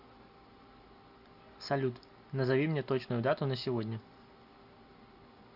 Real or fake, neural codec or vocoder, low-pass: real; none; 5.4 kHz